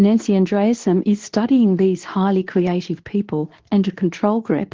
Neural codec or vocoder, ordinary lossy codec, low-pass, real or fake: codec, 24 kHz, 0.9 kbps, WavTokenizer, medium speech release version 2; Opus, 16 kbps; 7.2 kHz; fake